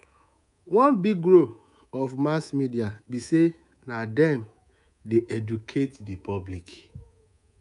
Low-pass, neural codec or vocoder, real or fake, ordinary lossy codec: 10.8 kHz; codec, 24 kHz, 3.1 kbps, DualCodec; fake; none